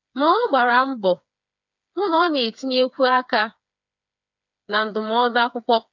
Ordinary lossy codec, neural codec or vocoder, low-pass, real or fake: none; codec, 16 kHz, 4 kbps, FreqCodec, smaller model; 7.2 kHz; fake